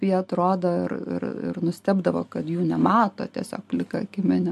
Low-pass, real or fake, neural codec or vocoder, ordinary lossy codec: 14.4 kHz; real; none; MP3, 64 kbps